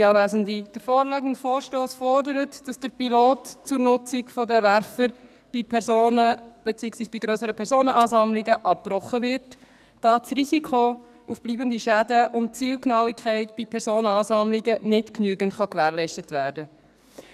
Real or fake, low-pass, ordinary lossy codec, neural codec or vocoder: fake; 14.4 kHz; none; codec, 44.1 kHz, 2.6 kbps, SNAC